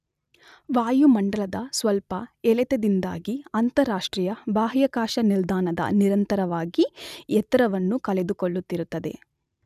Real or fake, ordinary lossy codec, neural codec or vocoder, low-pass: real; none; none; 14.4 kHz